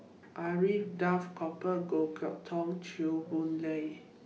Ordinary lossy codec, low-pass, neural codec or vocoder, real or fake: none; none; none; real